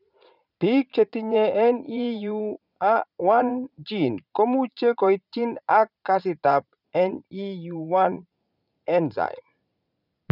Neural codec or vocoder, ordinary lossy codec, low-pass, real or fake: vocoder, 44.1 kHz, 80 mel bands, Vocos; none; 5.4 kHz; fake